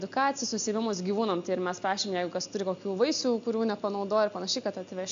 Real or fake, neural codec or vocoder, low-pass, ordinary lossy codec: real; none; 7.2 kHz; AAC, 64 kbps